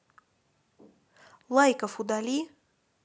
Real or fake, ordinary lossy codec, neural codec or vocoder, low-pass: real; none; none; none